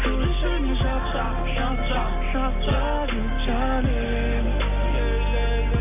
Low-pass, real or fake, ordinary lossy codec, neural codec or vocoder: 3.6 kHz; real; none; none